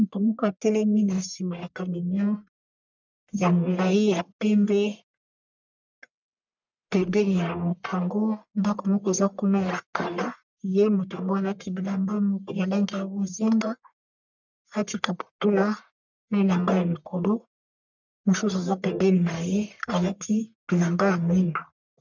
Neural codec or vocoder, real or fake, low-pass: codec, 44.1 kHz, 1.7 kbps, Pupu-Codec; fake; 7.2 kHz